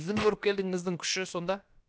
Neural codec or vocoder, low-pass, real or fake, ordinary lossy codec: codec, 16 kHz, about 1 kbps, DyCAST, with the encoder's durations; none; fake; none